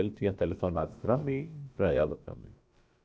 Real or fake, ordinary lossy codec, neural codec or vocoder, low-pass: fake; none; codec, 16 kHz, about 1 kbps, DyCAST, with the encoder's durations; none